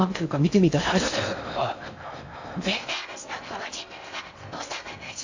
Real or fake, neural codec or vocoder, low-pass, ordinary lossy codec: fake; codec, 16 kHz in and 24 kHz out, 0.6 kbps, FocalCodec, streaming, 4096 codes; 7.2 kHz; AAC, 48 kbps